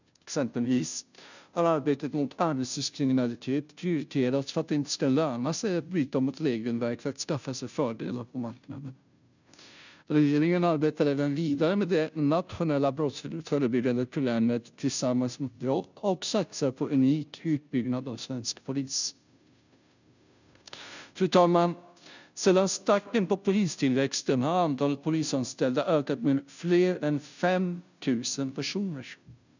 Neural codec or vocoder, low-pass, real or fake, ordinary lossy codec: codec, 16 kHz, 0.5 kbps, FunCodec, trained on Chinese and English, 25 frames a second; 7.2 kHz; fake; none